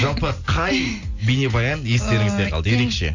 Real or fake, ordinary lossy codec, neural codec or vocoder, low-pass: real; Opus, 64 kbps; none; 7.2 kHz